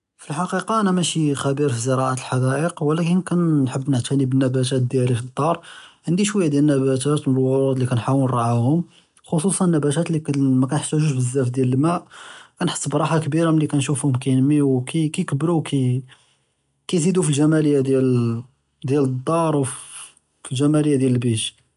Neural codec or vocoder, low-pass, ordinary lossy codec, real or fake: none; 10.8 kHz; none; real